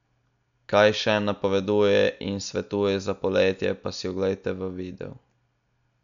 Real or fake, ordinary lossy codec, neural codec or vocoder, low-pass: real; none; none; 7.2 kHz